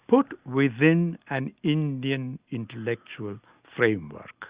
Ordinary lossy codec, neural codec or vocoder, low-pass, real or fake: Opus, 64 kbps; none; 3.6 kHz; real